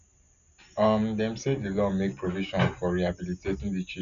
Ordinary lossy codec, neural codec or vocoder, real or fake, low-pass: none; none; real; 7.2 kHz